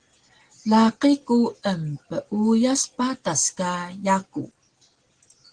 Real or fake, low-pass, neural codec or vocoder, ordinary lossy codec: real; 9.9 kHz; none; Opus, 16 kbps